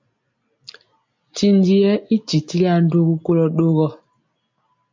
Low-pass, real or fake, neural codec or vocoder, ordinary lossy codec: 7.2 kHz; real; none; MP3, 48 kbps